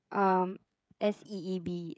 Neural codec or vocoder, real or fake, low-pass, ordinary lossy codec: codec, 16 kHz, 16 kbps, FreqCodec, smaller model; fake; none; none